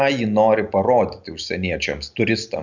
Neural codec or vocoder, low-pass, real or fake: none; 7.2 kHz; real